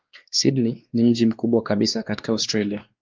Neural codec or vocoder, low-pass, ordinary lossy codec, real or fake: codec, 16 kHz, 2 kbps, X-Codec, WavLM features, trained on Multilingual LibriSpeech; 7.2 kHz; Opus, 32 kbps; fake